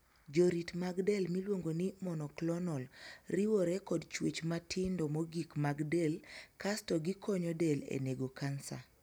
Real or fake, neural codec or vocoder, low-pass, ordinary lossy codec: real; none; none; none